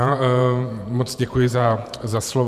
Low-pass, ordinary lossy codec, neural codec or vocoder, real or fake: 14.4 kHz; MP3, 96 kbps; vocoder, 48 kHz, 128 mel bands, Vocos; fake